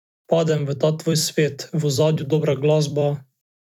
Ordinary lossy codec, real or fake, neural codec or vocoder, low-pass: none; fake; vocoder, 44.1 kHz, 128 mel bands every 256 samples, BigVGAN v2; 19.8 kHz